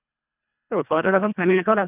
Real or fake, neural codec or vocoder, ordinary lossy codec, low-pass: fake; codec, 24 kHz, 1.5 kbps, HILCodec; none; 3.6 kHz